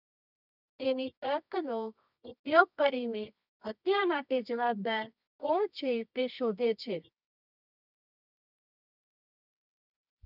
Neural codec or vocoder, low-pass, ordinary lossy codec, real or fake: codec, 24 kHz, 0.9 kbps, WavTokenizer, medium music audio release; 5.4 kHz; none; fake